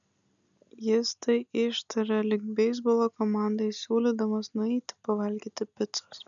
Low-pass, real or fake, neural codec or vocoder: 7.2 kHz; real; none